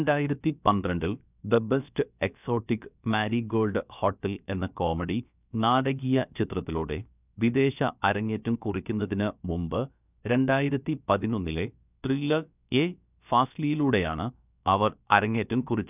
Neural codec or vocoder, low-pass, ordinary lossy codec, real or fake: codec, 16 kHz, about 1 kbps, DyCAST, with the encoder's durations; 3.6 kHz; none; fake